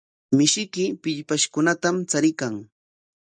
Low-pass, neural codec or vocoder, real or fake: 9.9 kHz; none; real